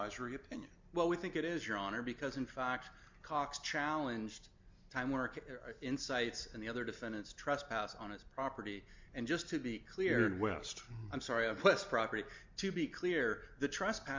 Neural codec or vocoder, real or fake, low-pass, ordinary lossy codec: none; real; 7.2 kHz; MP3, 64 kbps